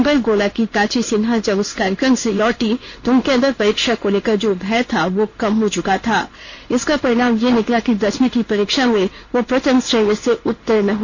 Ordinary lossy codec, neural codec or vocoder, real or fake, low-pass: none; codec, 16 kHz in and 24 kHz out, 1 kbps, XY-Tokenizer; fake; 7.2 kHz